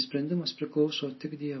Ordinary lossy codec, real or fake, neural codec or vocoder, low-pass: MP3, 24 kbps; real; none; 7.2 kHz